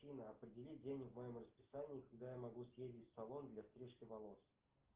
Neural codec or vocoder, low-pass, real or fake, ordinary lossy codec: none; 3.6 kHz; real; Opus, 16 kbps